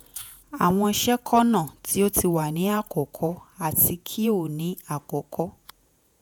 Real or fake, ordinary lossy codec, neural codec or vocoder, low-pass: fake; none; vocoder, 48 kHz, 128 mel bands, Vocos; none